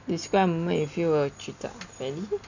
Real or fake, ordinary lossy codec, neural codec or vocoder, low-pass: real; none; none; 7.2 kHz